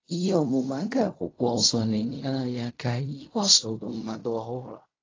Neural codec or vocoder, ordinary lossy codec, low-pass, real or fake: codec, 16 kHz in and 24 kHz out, 0.4 kbps, LongCat-Audio-Codec, fine tuned four codebook decoder; AAC, 32 kbps; 7.2 kHz; fake